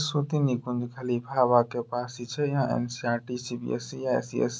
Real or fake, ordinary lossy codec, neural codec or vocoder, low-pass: real; none; none; none